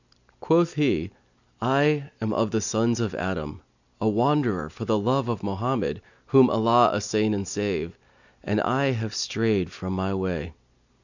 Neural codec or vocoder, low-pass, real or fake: none; 7.2 kHz; real